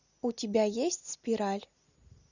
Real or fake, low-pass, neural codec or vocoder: real; 7.2 kHz; none